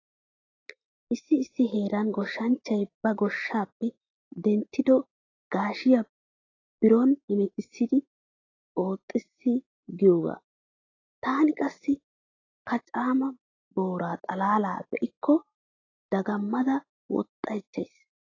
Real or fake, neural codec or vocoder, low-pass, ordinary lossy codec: real; none; 7.2 kHz; AAC, 32 kbps